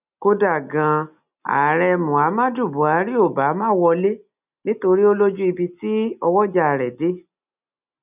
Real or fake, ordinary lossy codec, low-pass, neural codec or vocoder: real; none; 3.6 kHz; none